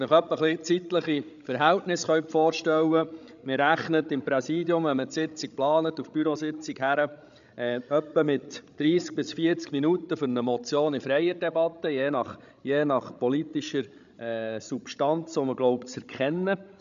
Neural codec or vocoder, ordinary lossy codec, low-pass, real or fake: codec, 16 kHz, 16 kbps, FreqCodec, larger model; none; 7.2 kHz; fake